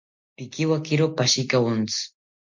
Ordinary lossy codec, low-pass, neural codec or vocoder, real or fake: MP3, 48 kbps; 7.2 kHz; none; real